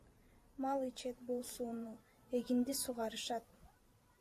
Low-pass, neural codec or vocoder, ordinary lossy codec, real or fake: 14.4 kHz; vocoder, 44.1 kHz, 128 mel bands every 512 samples, BigVGAN v2; AAC, 64 kbps; fake